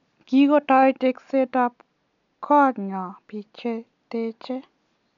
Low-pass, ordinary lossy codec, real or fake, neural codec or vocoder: 7.2 kHz; none; real; none